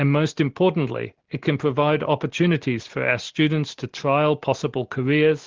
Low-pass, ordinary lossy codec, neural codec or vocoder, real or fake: 7.2 kHz; Opus, 16 kbps; none; real